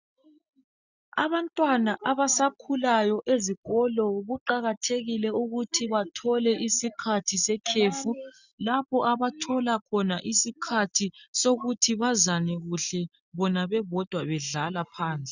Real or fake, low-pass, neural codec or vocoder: real; 7.2 kHz; none